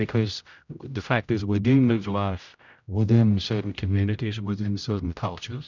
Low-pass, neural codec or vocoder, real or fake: 7.2 kHz; codec, 16 kHz, 0.5 kbps, X-Codec, HuBERT features, trained on general audio; fake